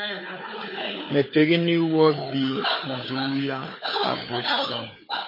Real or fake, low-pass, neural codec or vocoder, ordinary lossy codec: fake; 5.4 kHz; codec, 16 kHz, 4 kbps, FunCodec, trained on Chinese and English, 50 frames a second; MP3, 24 kbps